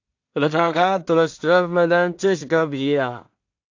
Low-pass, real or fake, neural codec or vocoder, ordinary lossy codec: 7.2 kHz; fake; codec, 16 kHz in and 24 kHz out, 0.4 kbps, LongCat-Audio-Codec, two codebook decoder; AAC, 48 kbps